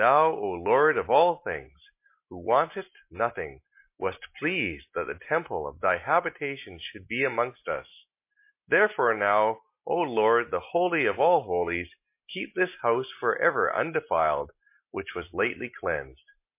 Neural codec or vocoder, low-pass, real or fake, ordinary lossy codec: none; 3.6 kHz; real; MP3, 24 kbps